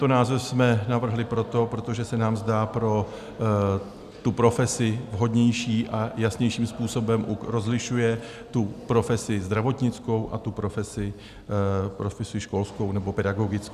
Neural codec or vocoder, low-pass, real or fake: none; 14.4 kHz; real